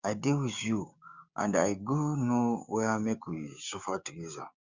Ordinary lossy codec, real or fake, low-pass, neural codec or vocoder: Opus, 64 kbps; fake; 7.2 kHz; codec, 16 kHz, 6 kbps, DAC